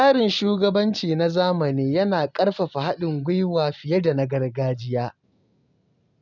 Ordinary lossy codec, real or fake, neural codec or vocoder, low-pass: none; real; none; 7.2 kHz